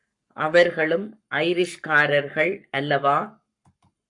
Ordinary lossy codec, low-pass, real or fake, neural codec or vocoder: AAC, 64 kbps; 10.8 kHz; fake; codec, 44.1 kHz, 7.8 kbps, Pupu-Codec